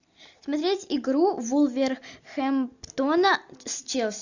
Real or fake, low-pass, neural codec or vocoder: real; 7.2 kHz; none